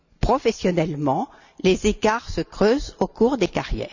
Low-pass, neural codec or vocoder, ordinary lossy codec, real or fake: 7.2 kHz; none; none; real